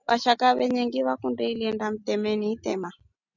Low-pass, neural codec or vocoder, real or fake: 7.2 kHz; none; real